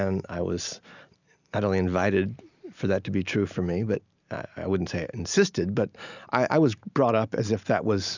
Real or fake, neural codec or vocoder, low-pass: real; none; 7.2 kHz